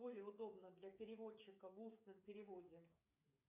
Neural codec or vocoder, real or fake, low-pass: codec, 16 kHz, 8 kbps, FreqCodec, smaller model; fake; 3.6 kHz